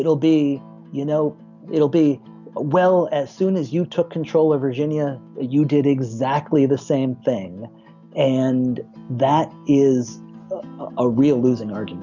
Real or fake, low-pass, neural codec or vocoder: real; 7.2 kHz; none